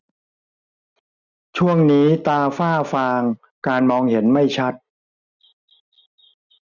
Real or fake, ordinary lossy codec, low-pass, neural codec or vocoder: real; none; 7.2 kHz; none